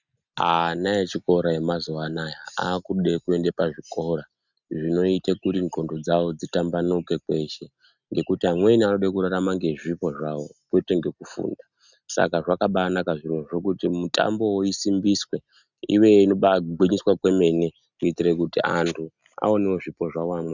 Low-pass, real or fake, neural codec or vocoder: 7.2 kHz; real; none